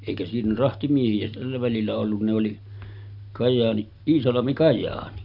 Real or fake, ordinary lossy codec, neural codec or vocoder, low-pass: real; none; none; 5.4 kHz